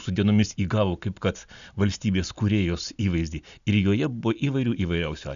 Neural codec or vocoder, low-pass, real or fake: none; 7.2 kHz; real